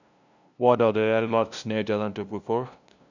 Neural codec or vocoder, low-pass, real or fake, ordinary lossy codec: codec, 16 kHz, 0.5 kbps, FunCodec, trained on LibriTTS, 25 frames a second; 7.2 kHz; fake; none